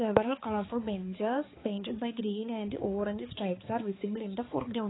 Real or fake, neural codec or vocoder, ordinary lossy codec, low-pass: fake; codec, 16 kHz, 4 kbps, X-Codec, HuBERT features, trained on balanced general audio; AAC, 16 kbps; 7.2 kHz